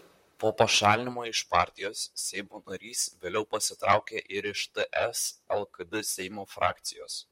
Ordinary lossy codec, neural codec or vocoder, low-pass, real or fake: MP3, 64 kbps; vocoder, 44.1 kHz, 128 mel bands, Pupu-Vocoder; 19.8 kHz; fake